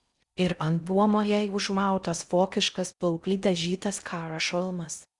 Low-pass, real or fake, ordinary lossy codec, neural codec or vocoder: 10.8 kHz; fake; Opus, 64 kbps; codec, 16 kHz in and 24 kHz out, 0.6 kbps, FocalCodec, streaming, 4096 codes